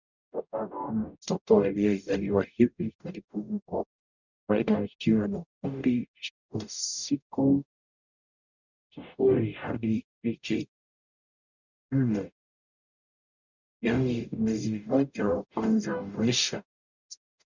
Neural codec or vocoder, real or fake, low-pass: codec, 44.1 kHz, 0.9 kbps, DAC; fake; 7.2 kHz